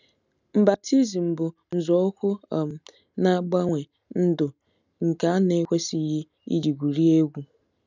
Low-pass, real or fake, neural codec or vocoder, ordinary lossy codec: 7.2 kHz; real; none; none